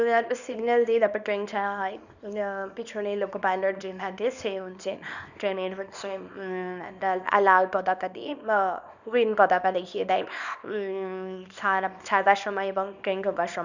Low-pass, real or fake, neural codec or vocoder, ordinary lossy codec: 7.2 kHz; fake; codec, 24 kHz, 0.9 kbps, WavTokenizer, small release; none